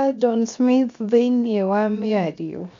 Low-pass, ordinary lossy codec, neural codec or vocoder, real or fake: 7.2 kHz; MP3, 64 kbps; codec, 16 kHz, 0.7 kbps, FocalCodec; fake